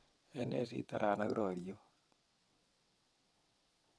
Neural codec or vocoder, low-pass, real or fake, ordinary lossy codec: vocoder, 22.05 kHz, 80 mel bands, WaveNeXt; none; fake; none